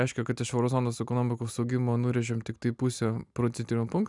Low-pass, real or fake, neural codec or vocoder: 10.8 kHz; real; none